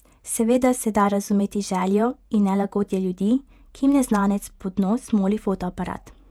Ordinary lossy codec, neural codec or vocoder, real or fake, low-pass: none; vocoder, 48 kHz, 128 mel bands, Vocos; fake; 19.8 kHz